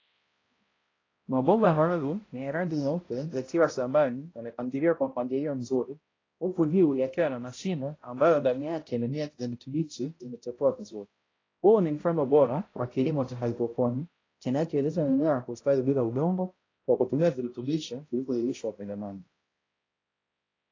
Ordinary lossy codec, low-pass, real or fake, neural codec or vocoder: AAC, 32 kbps; 7.2 kHz; fake; codec, 16 kHz, 0.5 kbps, X-Codec, HuBERT features, trained on balanced general audio